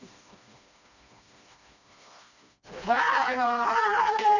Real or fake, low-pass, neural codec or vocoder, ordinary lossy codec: fake; 7.2 kHz; codec, 16 kHz, 2 kbps, FreqCodec, smaller model; none